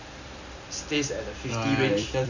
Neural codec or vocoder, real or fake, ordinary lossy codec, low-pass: vocoder, 44.1 kHz, 128 mel bands every 256 samples, BigVGAN v2; fake; none; 7.2 kHz